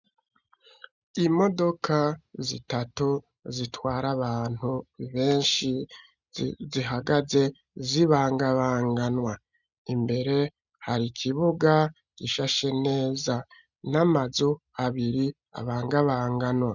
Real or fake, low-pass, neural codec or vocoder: real; 7.2 kHz; none